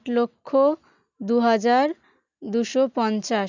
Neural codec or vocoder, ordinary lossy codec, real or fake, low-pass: none; none; real; 7.2 kHz